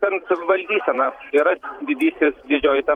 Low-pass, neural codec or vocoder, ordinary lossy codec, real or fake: 19.8 kHz; vocoder, 44.1 kHz, 128 mel bands every 512 samples, BigVGAN v2; MP3, 96 kbps; fake